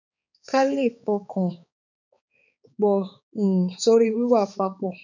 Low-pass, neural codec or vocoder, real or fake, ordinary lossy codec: 7.2 kHz; codec, 16 kHz, 4 kbps, X-Codec, HuBERT features, trained on balanced general audio; fake; none